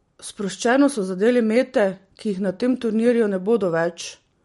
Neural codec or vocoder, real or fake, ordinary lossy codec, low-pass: none; real; MP3, 48 kbps; 19.8 kHz